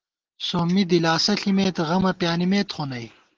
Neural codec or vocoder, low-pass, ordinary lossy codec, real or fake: none; 7.2 kHz; Opus, 16 kbps; real